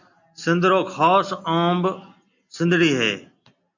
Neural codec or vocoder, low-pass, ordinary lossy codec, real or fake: none; 7.2 kHz; MP3, 64 kbps; real